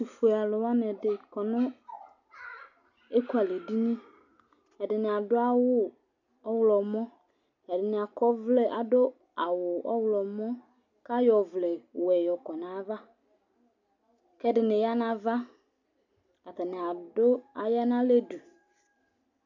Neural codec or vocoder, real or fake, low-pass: none; real; 7.2 kHz